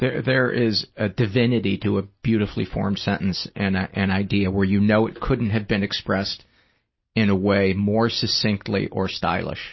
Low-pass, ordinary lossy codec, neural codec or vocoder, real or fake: 7.2 kHz; MP3, 24 kbps; none; real